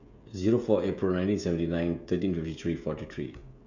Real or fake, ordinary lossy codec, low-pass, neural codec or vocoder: fake; none; 7.2 kHz; codec, 16 kHz, 16 kbps, FreqCodec, smaller model